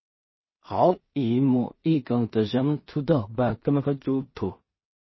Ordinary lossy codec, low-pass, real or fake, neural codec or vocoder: MP3, 24 kbps; 7.2 kHz; fake; codec, 16 kHz in and 24 kHz out, 0.4 kbps, LongCat-Audio-Codec, two codebook decoder